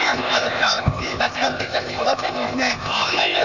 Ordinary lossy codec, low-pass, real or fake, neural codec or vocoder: none; 7.2 kHz; fake; codec, 16 kHz, 0.8 kbps, ZipCodec